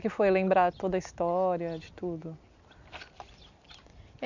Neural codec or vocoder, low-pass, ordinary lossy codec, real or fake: none; 7.2 kHz; none; real